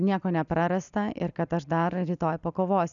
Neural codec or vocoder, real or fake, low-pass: none; real; 7.2 kHz